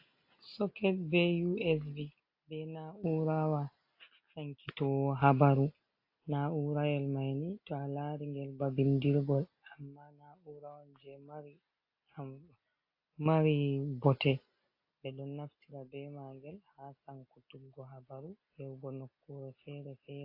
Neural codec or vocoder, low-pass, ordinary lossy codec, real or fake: none; 5.4 kHz; AAC, 32 kbps; real